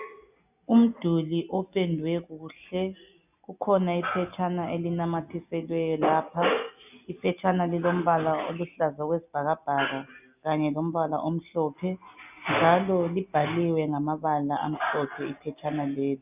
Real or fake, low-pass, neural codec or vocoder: real; 3.6 kHz; none